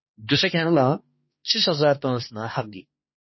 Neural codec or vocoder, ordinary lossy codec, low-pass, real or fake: codec, 16 kHz, 2 kbps, X-Codec, HuBERT features, trained on balanced general audio; MP3, 24 kbps; 7.2 kHz; fake